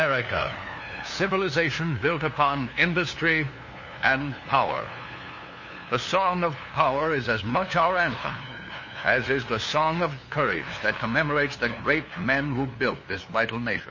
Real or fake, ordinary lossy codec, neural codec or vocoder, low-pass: fake; MP3, 32 kbps; codec, 16 kHz, 2 kbps, FunCodec, trained on LibriTTS, 25 frames a second; 7.2 kHz